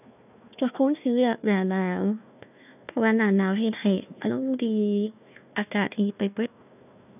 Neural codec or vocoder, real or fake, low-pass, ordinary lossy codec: codec, 16 kHz, 1 kbps, FunCodec, trained on Chinese and English, 50 frames a second; fake; 3.6 kHz; none